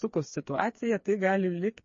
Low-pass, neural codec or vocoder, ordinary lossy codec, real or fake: 7.2 kHz; codec, 16 kHz, 4 kbps, FreqCodec, smaller model; MP3, 32 kbps; fake